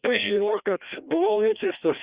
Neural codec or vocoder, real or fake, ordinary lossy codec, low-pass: codec, 16 kHz, 1 kbps, FreqCodec, larger model; fake; Opus, 64 kbps; 3.6 kHz